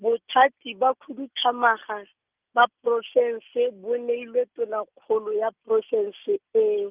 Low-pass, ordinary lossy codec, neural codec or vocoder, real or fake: 3.6 kHz; Opus, 24 kbps; none; real